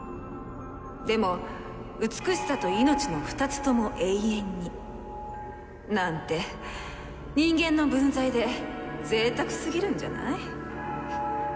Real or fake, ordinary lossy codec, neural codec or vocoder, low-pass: real; none; none; none